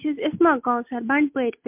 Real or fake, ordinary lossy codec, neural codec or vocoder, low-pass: real; MP3, 32 kbps; none; 3.6 kHz